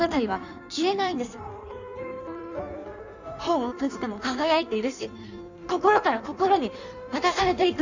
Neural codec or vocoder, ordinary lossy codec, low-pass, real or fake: codec, 16 kHz in and 24 kHz out, 1.1 kbps, FireRedTTS-2 codec; none; 7.2 kHz; fake